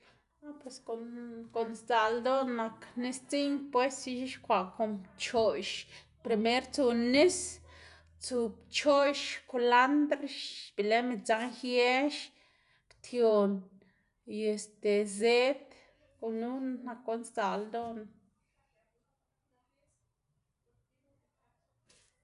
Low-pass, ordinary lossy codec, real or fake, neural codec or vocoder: 10.8 kHz; none; real; none